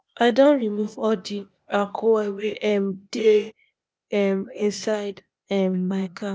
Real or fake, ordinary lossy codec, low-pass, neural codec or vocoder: fake; none; none; codec, 16 kHz, 0.8 kbps, ZipCodec